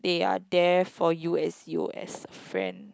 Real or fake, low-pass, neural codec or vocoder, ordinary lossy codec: real; none; none; none